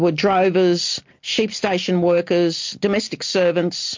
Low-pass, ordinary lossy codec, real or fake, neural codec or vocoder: 7.2 kHz; MP3, 48 kbps; real; none